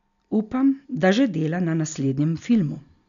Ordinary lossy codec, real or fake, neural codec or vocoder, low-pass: none; real; none; 7.2 kHz